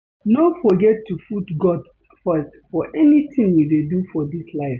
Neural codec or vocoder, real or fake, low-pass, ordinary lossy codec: none; real; none; none